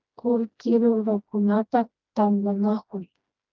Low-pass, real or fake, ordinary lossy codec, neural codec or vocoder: 7.2 kHz; fake; Opus, 24 kbps; codec, 16 kHz, 1 kbps, FreqCodec, smaller model